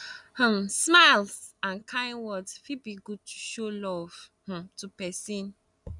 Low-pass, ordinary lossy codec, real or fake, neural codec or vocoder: 10.8 kHz; none; real; none